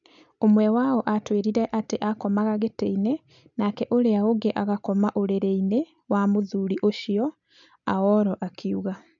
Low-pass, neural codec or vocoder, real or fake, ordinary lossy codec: 7.2 kHz; none; real; none